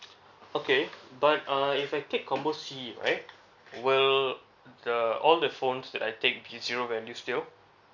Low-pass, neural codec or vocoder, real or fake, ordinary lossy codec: 7.2 kHz; none; real; none